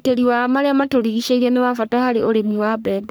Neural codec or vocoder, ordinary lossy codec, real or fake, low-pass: codec, 44.1 kHz, 3.4 kbps, Pupu-Codec; none; fake; none